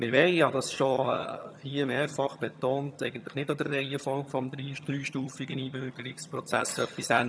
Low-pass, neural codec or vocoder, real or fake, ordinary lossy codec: none; vocoder, 22.05 kHz, 80 mel bands, HiFi-GAN; fake; none